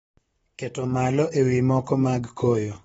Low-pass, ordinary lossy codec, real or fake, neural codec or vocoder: 19.8 kHz; AAC, 24 kbps; fake; vocoder, 44.1 kHz, 128 mel bands, Pupu-Vocoder